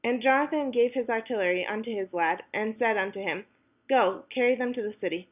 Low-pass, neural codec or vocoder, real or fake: 3.6 kHz; none; real